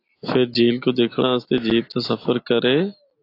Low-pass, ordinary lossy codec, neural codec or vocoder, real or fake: 5.4 kHz; AAC, 32 kbps; none; real